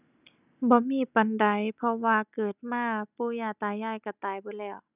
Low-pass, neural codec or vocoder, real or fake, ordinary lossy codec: 3.6 kHz; none; real; none